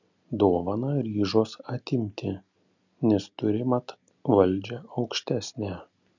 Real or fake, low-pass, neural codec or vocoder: real; 7.2 kHz; none